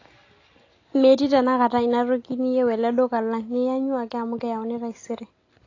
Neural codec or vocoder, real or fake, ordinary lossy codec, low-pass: none; real; AAC, 32 kbps; 7.2 kHz